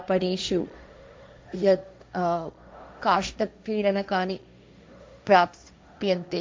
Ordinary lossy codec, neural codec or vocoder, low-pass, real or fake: none; codec, 16 kHz, 1.1 kbps, Voila-Tokenizer; none; fake